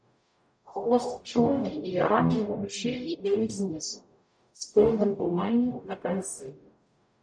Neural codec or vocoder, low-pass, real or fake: codec, 44.1 kHz, 0.9 kbps, DAC; 9.9 kHz; fake